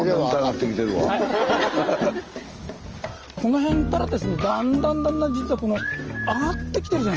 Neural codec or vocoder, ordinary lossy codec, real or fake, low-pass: none; Opus, 24 kbps; real; 7.2 kHz